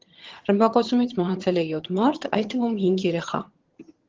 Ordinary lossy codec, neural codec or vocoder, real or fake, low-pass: Opus, 16 kbps; vocoder, 22.05 kHz, 80 mel bands, HiFi-GAN; fake; 7.2 kHz